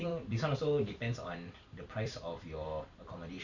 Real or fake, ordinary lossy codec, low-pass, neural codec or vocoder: fake; none; 7.2 kHz; vocoder, 44.1 kHz, 128 mel bands every 256 samples, BigVGAN v2